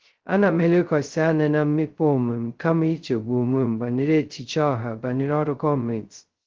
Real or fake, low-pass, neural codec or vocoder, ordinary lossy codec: fake; 7.2 kHz; codec, 16 kHz, 0.2 kbps, FocalCodec; Opus, 16 kbps